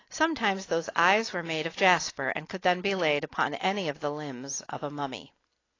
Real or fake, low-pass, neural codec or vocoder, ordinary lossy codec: real; 7.2 kHz; none; AAC, 32 kbps